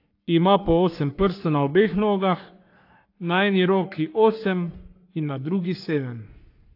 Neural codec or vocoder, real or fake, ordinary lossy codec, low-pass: codec, 44.1 kHz, 3.4 kbps, Pupu-Codec; fake; AAC, 32 kbps; 5.4 kHz